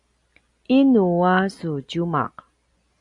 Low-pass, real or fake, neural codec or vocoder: 10.8 kHz; real; none